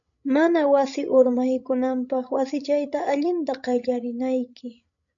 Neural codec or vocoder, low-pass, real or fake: codec, 16 kHz, 16 kbps, FreqCodec, larger model; 7.2 kHz; fake